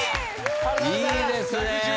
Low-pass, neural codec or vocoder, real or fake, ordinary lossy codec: none; none; real; none